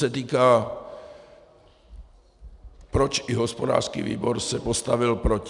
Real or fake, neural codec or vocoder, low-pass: real; none; 10.8 kHz